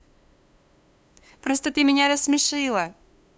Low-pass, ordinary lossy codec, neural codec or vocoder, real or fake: none; none; codec, 16 kHz, 2 kbps, FunCodec, trained on LibriTTS, 25 frames a second; fake